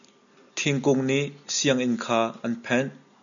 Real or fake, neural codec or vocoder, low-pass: real; none; 7.2 kHz